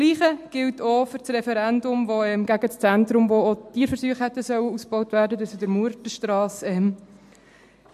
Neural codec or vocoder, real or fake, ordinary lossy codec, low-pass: none; real; MP3, 64 kbps; 14.4 kHz